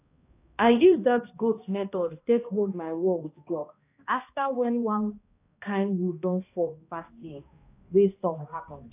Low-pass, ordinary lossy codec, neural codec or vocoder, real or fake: 3.6 kHz; AAC, 24 kbps; codec, 16 kHz, 1 kbps, X-Codec, HuBERT features, trained on balanced general audio; fake